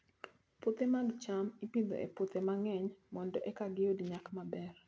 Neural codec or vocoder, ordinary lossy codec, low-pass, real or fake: none; none; none; real